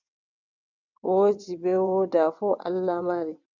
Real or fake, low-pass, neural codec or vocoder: fake; 7.2 kHz; vocoder, 22.05 kHz, 80 mel bands, WaveNeXt